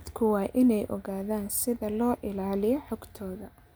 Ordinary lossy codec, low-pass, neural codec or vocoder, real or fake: none; none; none; real